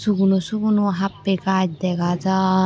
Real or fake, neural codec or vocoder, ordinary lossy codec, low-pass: real; none; none; none